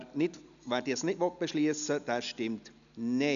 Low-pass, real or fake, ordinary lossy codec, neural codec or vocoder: 7.2 kHz; real; none; none